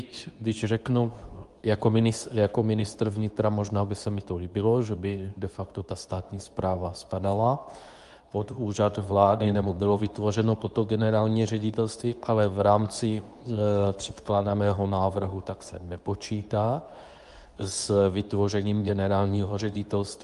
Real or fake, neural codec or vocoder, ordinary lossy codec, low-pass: fake; codec, 24 kHz, 0.9 kbps, WavTokenizer, medium speech release version 2; Opus, 32 kbps; 10.8 kHz